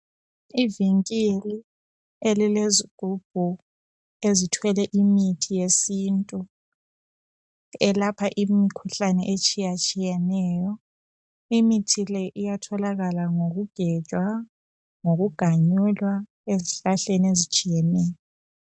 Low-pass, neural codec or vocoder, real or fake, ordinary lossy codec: 9.9 kHz; none; real; MP3, 96 kbps